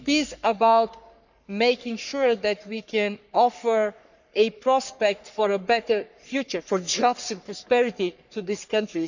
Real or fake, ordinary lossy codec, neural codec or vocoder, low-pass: fake; none; codec, 44.1 kHz, 3.4 kbps, Pupu-Codec; 7.2 kHz